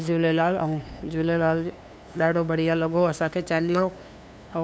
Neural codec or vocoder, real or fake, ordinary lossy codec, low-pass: codec, 16 kHz, 2 kbps, FunCodec, trained on LibriTTS, 25 frames a second; fake; none; none